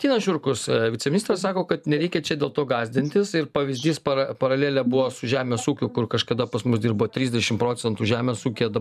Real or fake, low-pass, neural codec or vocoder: fake; 14.4 kHz; vocoder, 44.1 kHz, 128 mel bands every 512 samples, BigVGAN v2